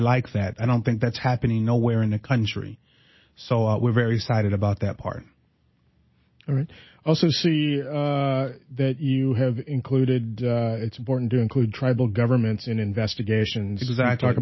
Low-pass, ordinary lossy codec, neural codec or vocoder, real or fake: 7.2 kHz; MP3, 24 kbps; none; real